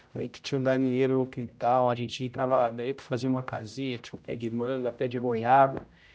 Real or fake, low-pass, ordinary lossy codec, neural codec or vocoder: fake; none; none; codec, 16 kHz, 0.5 kbps, X-Codec, HuBERT features, trained on general audio